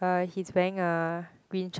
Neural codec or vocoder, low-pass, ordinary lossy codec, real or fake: none; none; none; real